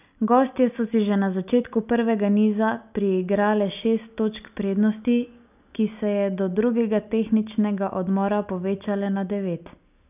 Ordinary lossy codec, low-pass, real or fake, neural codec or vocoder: none; 3.6 kHz; real; none